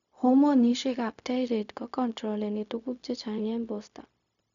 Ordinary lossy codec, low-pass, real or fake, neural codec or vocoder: none; 7.2 kHz; fake; codec, 16 kHz, 0.4 kbps, LongCat-Audio-Codec